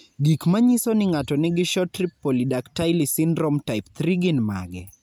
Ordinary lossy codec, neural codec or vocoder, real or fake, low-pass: none; none; real; none